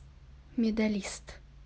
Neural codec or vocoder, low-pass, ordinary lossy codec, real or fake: none; none; none; real